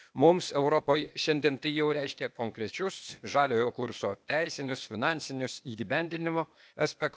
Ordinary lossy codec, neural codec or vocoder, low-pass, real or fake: none; codec, 16 kHz, 0.8 kbps, ZipCodec; none; fake